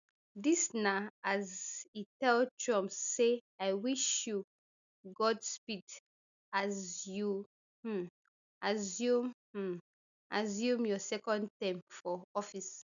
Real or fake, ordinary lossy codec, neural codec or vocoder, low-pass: real; none; none; 7.2 kHz